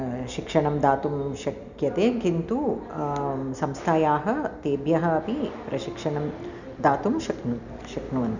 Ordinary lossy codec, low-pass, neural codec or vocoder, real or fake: none; 7.2 kHz; none; real